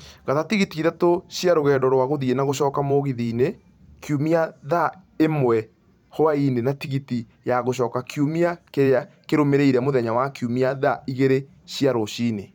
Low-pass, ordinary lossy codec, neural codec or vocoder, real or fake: 19.8 kHz; none; vocoder, 44.1 kHz, 128 mel bands every 512 samples, BigVGAN v2; fake